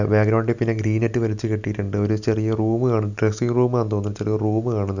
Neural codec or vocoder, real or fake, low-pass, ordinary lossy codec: none; real; 7.2 kHz; none